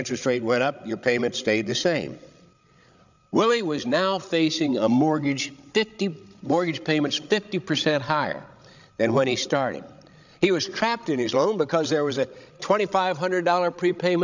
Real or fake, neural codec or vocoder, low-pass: fake; codec, 16 kHz, 16 kbps, FreqCodec, larger model; 7.2 kHz